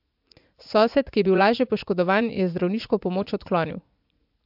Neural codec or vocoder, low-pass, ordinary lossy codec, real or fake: vocoder, 44.1 kHz, 80 mel bands, Vocos; 5.4 kHz; MP3, 48 kbps; fake